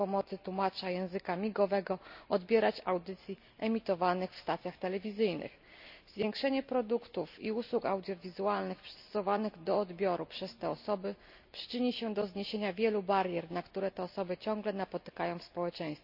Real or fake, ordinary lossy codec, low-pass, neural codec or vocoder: real; none; 5.4 kHz; none